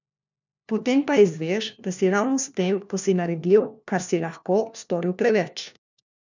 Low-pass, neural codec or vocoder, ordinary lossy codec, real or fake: 7.2 kHz; codec, 16 kHz, 1 kbps, FunCodec, trained on LibriTTS, 50 frames a second; none; fake